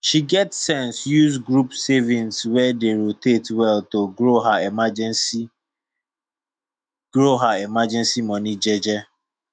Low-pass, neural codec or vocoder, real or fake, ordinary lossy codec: 9.9 kHz; autoencoder, 48 kHz, 128 numbers a frame, DAC-VAE, trained on Japanese speech; fake; none